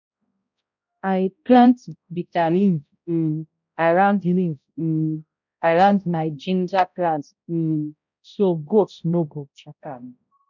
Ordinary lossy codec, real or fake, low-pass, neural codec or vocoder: none; fake; 7.2 kHz; codec, 16 kHz, 0.5 kbps, X-Codec, HuBERT features, trained on balanced general audio